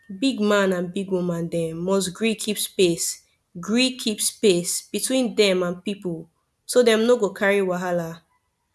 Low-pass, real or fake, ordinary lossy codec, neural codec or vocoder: none; real; none; none